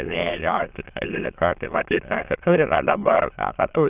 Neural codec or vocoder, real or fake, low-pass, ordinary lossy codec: autoencoder, 22.05 kHz, a latent of 192 numbers a frame, VITS, trained on many speakers; fake; 3.6 kHz; Opus, 24 kbps